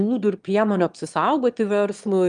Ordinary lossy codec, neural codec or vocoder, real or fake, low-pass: Opus, 32 kbps; autoencoder, 22.05 kHz, a latent of 192 numbers a frame, VITS, trained on one speaker; fake; 9.9 kHz